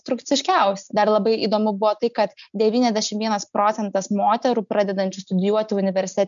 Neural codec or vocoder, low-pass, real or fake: none; 7.2 kHz; real